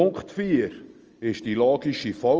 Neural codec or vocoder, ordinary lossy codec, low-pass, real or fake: none; Opus, 24 kbps; 7.2 kHz; real